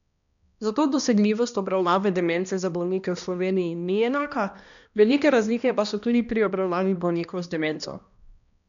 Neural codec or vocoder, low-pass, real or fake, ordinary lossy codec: codec, 16 kHz, 1 kbps, X-Codec, HuBERT features, trained on balanced general audio; 7.2 kHz; fake; none